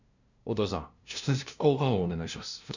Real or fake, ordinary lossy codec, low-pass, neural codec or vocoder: fake; none; 7.2 kHz; codec, 16 kHz, 0.5 kbps, FunCodec, trained on LibriTTS, 25 frames a second